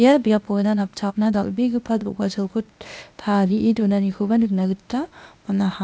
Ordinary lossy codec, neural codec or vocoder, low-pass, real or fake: none; codec, 16 kHz, 0.8 kbps, ZipCodec; none; fake